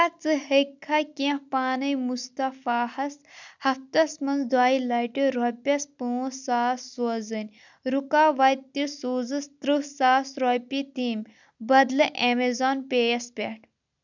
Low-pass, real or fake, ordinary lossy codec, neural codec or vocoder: 7.2 kHz; real; none; none